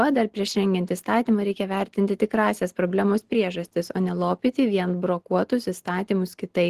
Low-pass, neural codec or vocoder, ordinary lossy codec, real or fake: 14.4 kHz; none; Opus, 16 kbps; real